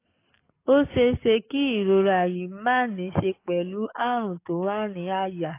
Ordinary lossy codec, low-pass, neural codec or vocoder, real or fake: AAC, 24 kbps; 3.6 kHz; codec, 44.1 kHz, 7.8 kbps, DAC; fake